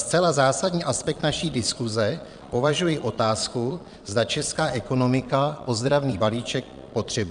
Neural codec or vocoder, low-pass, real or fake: vocoder, 22.05 kHz, 80 mel bands, Vocos; 9.9 kHz; fake